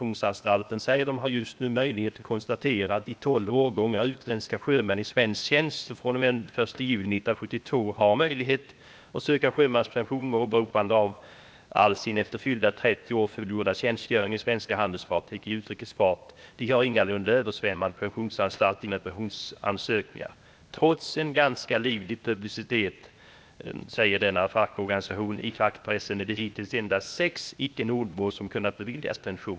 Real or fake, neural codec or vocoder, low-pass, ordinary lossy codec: fake; codec, 16 kHz, 0.8 kbps, ZipCodec; none; none